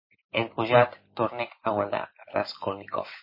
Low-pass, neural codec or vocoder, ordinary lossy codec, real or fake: 5.4 kHz; none; MP3, 24 kbps; real